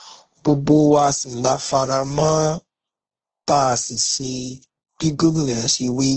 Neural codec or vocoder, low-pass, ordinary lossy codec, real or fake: codec, 16 kHz, 1.1 kbps, Voila-Tokenizer; 7.2 kHz; Opus, 16 kbps; fake